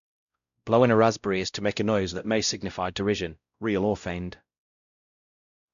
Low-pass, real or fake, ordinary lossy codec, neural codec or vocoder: 7.2 kHz; fake; none; codec, 16 kHz, 0.5 kbps, X-Codec, WavLM features, trained on Multilingual LibriSpeech